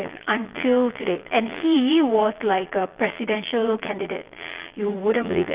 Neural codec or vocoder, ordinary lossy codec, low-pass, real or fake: vocoder, 22.05 kHz, 80 mel bands, Vocos; Opus, 32 kbps; 3.6 kHz; fake